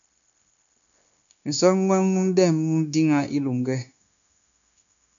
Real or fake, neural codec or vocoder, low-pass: fake; codec, 16 kHz, 0.9 kbps, LongCat-Audio-Codec; 7.2 kHz